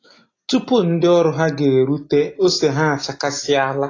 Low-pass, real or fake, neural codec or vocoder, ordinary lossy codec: 7.2 kHz; real; none; AAC, 32 kbps